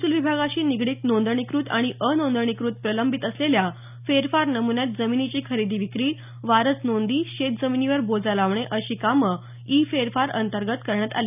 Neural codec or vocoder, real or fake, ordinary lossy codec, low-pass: none; real; none; 3.6 kHz